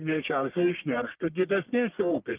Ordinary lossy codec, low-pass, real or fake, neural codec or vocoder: Opus, 32 kbps; 3.6 kHz; fake; codec, 44.1 kHz, 1.7 kbps, Pupu-Codec